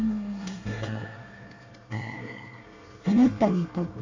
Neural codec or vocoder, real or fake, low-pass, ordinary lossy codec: codec, 24 kHz, 1 kbps, SNAC; fake; 7.2 kHz; AAC, 48 kbps